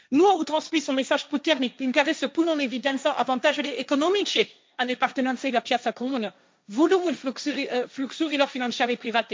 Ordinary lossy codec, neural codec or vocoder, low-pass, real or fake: none; codec, 16 kHz, 1.1 kbps, Voila-Tokenizer; none; fake